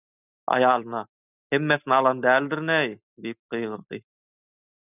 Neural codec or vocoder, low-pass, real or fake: none; 3.6 kHz; real